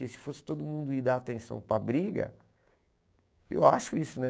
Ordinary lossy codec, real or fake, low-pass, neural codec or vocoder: none; fake; none; codec, 16 kHz, 6 kbps, DAC